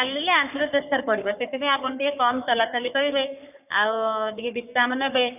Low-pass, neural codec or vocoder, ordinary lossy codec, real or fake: 3.6 kHz; codec, 44.1 kHz, 3.4 kbps, Pupu-Codec; none; fake